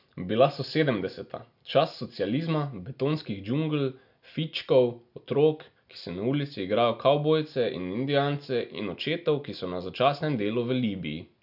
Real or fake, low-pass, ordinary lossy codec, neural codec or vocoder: real; 5.4 kHz; none; none